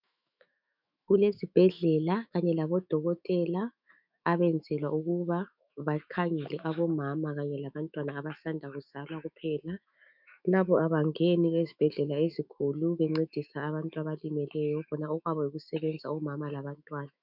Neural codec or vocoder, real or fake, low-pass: autoencoder, 48 kHz, 128 numbers a frame, DAC-VAE, trained on Japanese speech; fake; 5.4 kHz